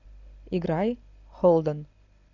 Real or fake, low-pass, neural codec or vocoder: real; 7.2 kHz; none